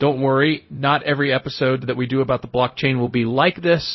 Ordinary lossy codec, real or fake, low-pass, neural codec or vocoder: MP3, 24 kbps; fake; 7.2 kHz; codec, 16 kHz, 0.4 kbps, LongCat-Audio-Codec